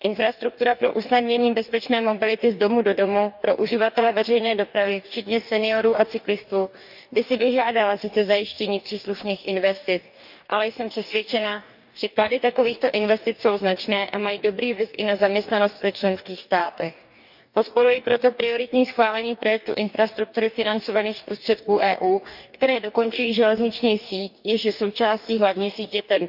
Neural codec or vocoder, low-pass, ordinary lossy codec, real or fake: codec, 44.1 kHz, 2.6 kbps, DAC; 5.4 kHz; none; fake